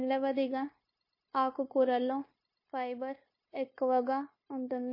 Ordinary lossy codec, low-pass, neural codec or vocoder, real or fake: MP3, 24 kbps; 5.4 kHz; codec, 16 kHz, 0.9 kbps, LongCat-Audio-Codec; fake